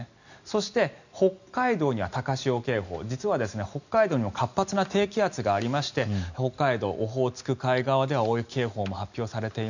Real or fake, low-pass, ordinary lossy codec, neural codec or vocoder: real; 7.2 kHz; none; none